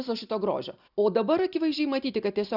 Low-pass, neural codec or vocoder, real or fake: 5.4 kHz; none; real